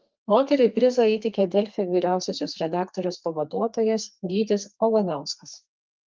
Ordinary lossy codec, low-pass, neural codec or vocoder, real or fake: Opus, 32 kbps; 7.2 kHz; codec, 32 kHz, 1.9 kbps, SNAC; fake